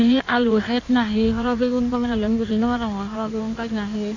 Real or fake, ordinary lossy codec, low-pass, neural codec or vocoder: fake; none; 7.2 kHz; codec, 16 kHz in and 24 kHz out, 1.1 kbps, FireRedTTS-2 codec